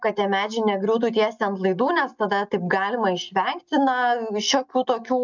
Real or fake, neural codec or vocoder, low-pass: real; none; 7.2 kHz